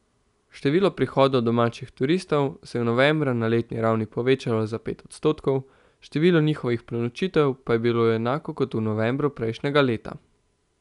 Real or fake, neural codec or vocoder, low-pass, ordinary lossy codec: real; none; 10.8 kHz; none